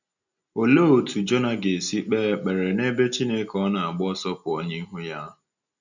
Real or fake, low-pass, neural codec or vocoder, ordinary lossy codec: real; 7.2 kHz; none; none